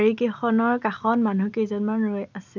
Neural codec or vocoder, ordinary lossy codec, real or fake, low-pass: none; none; real; 7.2 kHz